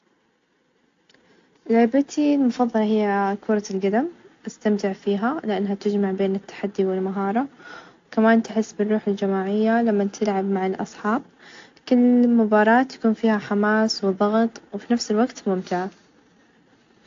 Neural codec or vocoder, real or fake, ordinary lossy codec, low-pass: none; real; AAC, 48 kbps; 7.2 kHz